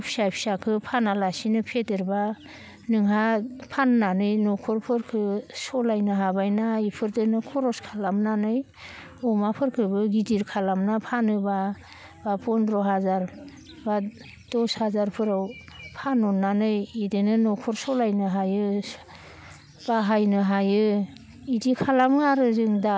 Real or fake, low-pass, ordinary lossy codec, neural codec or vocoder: real; none; none; none